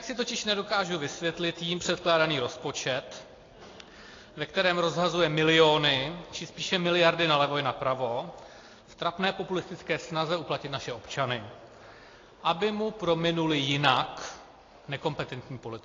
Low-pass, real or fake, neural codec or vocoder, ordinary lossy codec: 7.2 kHz; real; none; AAC, 32 kbps